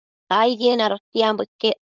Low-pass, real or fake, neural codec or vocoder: 7.2 kHz; fake; codec, 16 kHz, 4.8 kbps, FACodec